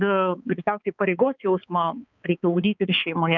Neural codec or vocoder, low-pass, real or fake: codec, 16 kHz, 4 kbps, X-Codec, HuBERT features, trained on balanced general audio; 7.2 kHz; fake